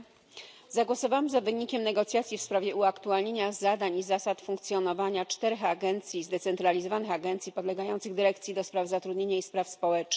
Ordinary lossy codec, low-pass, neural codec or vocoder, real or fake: none; none; none; real